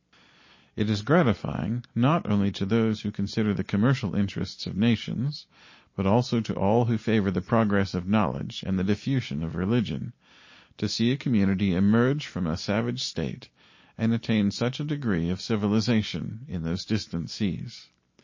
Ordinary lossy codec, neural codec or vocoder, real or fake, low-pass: MP3, 32 kbps; none; real; 7.2 kHz